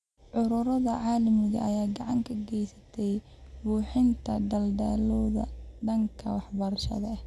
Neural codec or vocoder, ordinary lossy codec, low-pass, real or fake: none; none; none; real